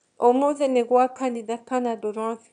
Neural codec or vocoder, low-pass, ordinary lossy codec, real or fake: autoencoder, 22.05 kHz, a latent of 192 numbers a frame, VITS, trained on one speaker; 9.9 kHz; none; fake